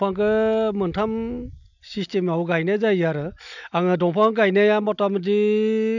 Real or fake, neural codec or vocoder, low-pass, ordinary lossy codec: real; none; 7.2 kHz; none